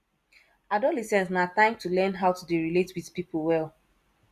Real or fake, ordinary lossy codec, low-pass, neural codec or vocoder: real; Opus, 64 kbps; 14.4 kHz; none